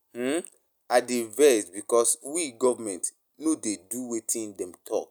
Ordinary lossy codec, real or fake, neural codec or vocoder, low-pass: none; real; none; none